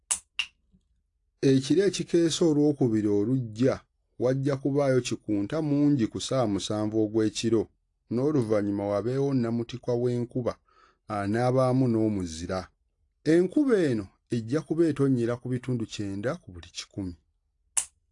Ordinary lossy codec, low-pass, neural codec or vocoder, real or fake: AAC, 48 kbps; 10.8 kHz; none; real